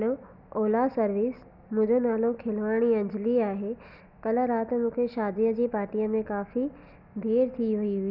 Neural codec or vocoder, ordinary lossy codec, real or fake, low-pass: none; none; real; 5.4 kHz